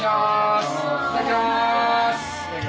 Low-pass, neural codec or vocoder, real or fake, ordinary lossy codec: none; none; real; none